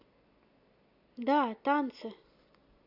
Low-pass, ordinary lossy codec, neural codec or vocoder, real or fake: 5.4 kHz; AAC, 48 kbps; none; real